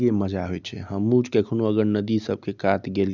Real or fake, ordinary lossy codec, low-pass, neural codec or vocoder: real; none; 7.2 kHz; none